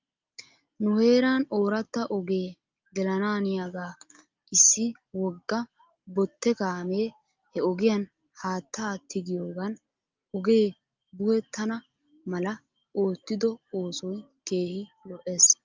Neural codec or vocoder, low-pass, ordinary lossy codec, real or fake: none; 7.2 kHz; Opus, 24 kbps; real